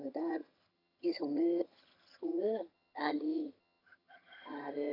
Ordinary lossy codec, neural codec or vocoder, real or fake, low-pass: none; vocoder, 22.05 kHz, 80 mel bands, HiFi-GAN; fake; 5.4 kHz